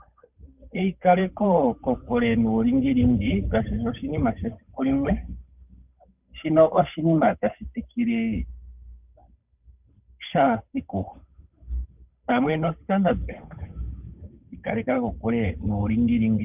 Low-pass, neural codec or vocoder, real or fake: 3.6 kHz; codec, 16 kHz, 8 kbps, FunCodec, trained on Chinese and English, 25 frames a second; fake